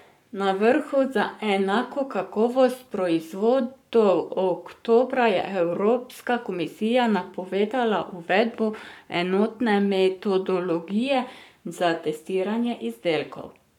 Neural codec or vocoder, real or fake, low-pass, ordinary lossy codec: codec, 44.1 kHz, 7.8 kbps, Pupu-Codec; fake; 19.8 kHz; none